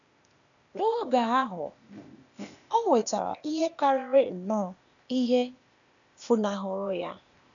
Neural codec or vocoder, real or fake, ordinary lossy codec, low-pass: codec, 16 kHz, 0.8 kbps, ZipCodec; fake; none; 7.2 kHz